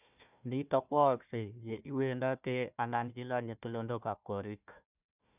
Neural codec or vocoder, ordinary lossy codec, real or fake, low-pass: codec, 16 kHz, 1 kbps, FunCodec, trained on Chinese and English, 50 frames a second; none; fake; 3.6 kHz